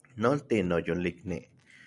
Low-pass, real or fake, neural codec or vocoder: 10.8 kHz; real; none